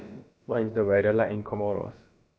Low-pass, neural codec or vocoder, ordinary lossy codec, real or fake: none; codec, 16 kHz, about 1 kbps, DyCAST, with the encoder's durations; none; fake